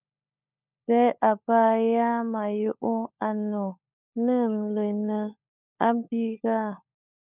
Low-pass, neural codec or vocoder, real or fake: 3.6 kHz; codec, 16 kHz, 16 kbps, FunCodec, trained on LibriTTS, 50 frames a second; fake